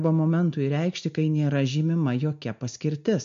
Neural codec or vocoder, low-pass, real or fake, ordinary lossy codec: none; 7.2 kHz; real; MP3, 48 kbps